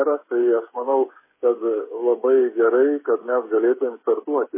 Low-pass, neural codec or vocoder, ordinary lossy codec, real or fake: 3.6 kHz; codec, 16 kHz, 16 kbps, FreqCodec, smaller model; MP3, 16 kbps; fake